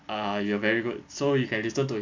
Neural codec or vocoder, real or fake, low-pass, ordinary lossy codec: none; real; 7.2 kHz; MP3, 64 kbps